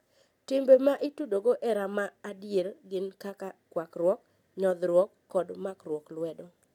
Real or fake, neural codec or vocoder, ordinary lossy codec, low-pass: real; none; none; 19.8 kHz